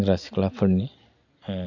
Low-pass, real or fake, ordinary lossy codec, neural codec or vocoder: 7.2 kHz; real; none; none